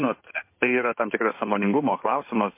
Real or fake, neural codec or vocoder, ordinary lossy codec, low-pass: fake; codec, 16 kHz in and 24 kHz out, 2.2 kbps, FireRedTTS-2 codec; MP3, 24 kbps; 3.6 kHz